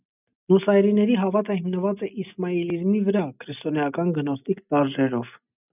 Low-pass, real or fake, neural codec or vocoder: 3.6 kHz; real; none